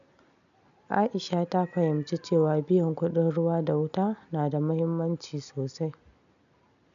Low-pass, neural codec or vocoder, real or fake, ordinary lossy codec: 7.2 kHz; none; real; none